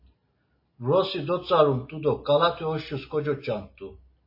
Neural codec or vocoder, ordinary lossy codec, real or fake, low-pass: none; MP3, 24 kbps; real; 5.4 kHz